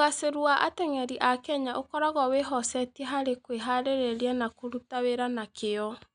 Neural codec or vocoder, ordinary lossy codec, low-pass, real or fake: none; none; 9.9 kHz; real